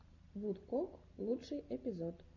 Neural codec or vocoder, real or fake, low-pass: none; real; 7.2 kHz